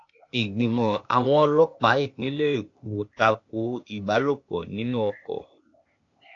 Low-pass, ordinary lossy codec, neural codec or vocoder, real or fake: 7.2 kHz; AAC, 48 kbps; codec, 16 kHz, 0.8 kbps, ZipCodec; fake